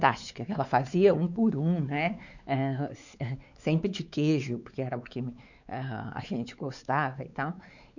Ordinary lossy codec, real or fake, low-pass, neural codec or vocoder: none; fake; 7.2 kHz; codec, 16 kHz, 4 kbps, X-Codec, WavLM features, trained on Multilingual LibriSpeech